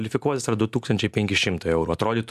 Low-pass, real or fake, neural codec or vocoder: 14.4 kHz; real; none